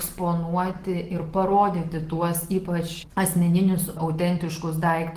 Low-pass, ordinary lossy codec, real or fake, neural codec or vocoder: 14.4 kHz; Opus, 16 kbps; real; none